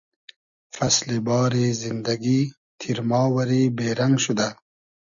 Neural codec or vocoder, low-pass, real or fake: none; 7.2 kHz; real